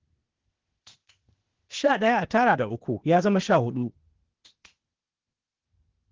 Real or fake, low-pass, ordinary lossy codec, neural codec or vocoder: fake; 7.2 kHz; Opus, 16 kbps; codec, 16 kHz, 0.8 kbps, ZipCodec